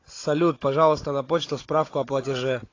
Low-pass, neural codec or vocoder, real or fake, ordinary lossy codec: 7.2 kHz; codec, 16 kHz, 16 kbps, FunCodec, trained on Chinese and English, 50 frames a second; fake; AAC, 32 kbps